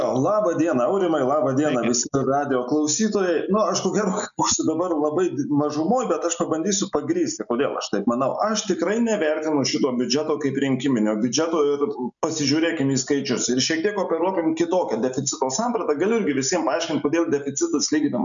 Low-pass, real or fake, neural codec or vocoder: 7.2 kHz; real; none